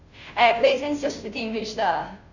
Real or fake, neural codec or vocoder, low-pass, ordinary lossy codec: fake; codec, 16 kHz, 0.5 kbps, FunCodec, trained on Chinese and English, 25 frames a second; 7.2 kHz; none